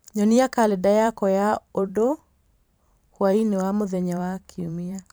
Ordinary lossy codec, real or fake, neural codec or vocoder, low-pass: none; fake; vocoder, 44.1 kHz, 128 mel bands every 512 samples, BigVGAN v2; none